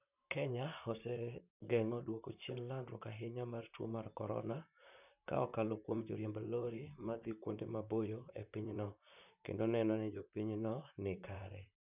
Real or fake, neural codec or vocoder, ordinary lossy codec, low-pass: fake; vocoder, 44.1 kHz, 128 mel bands, Pupu-Vocoder; none; 3.6 kHz